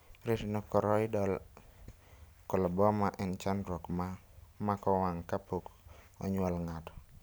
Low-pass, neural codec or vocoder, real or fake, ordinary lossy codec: none; none; real; none